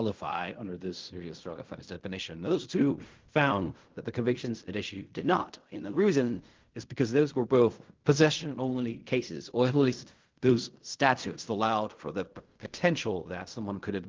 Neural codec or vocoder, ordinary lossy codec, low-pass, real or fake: codec, 16 kHz in and 24 kHz out, 0.4 kbps, LongCat-Audio-Codec, fine tuned four codebook decoder; Opus, 32 kbps; 7.2 kHz; fake